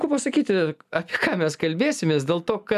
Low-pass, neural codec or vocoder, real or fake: 14.4 kHz; none; real